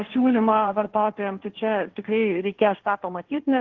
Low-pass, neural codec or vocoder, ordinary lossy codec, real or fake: 7.2 kHz; codec, 16 kHz, 1.1 kbps, Voila-Tokenizer; Opus, 16 kbps; fake